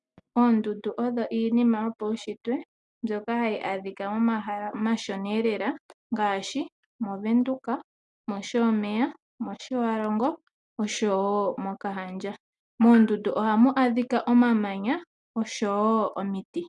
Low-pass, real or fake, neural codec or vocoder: 10.8 kHz; real; none